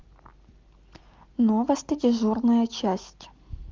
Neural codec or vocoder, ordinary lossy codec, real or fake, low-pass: none; Opus, 32 kbps; real; 7.2 kHz